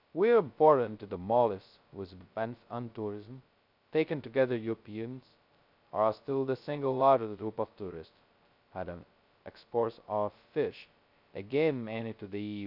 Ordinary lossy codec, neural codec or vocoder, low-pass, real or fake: none; codec, 16 kHz, 0.2 kbps, FocalCodec; 5.4 kHz; fake